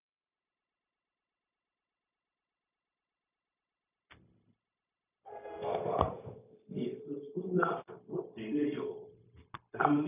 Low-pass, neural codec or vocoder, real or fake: 3.6 kHz; codec, 16 kHz, 0.4 kbps, LongCat-Audio-Codec; fake